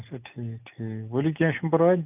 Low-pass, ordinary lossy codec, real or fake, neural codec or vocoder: 3.6 kHz; none; real; none